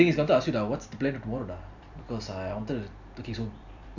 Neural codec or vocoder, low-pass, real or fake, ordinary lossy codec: vocoder, 44.1 kHz, 128 mel bands every 256 samples, BigVGAN v2; 7.2 kHz; fake; none